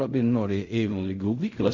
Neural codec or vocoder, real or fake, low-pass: codec, 16 kHz in and 24 kHz out, 0.4 kbps, LongCat-Audio-Codec, fine tuned four codebook decoder; fake; 7.2 kHz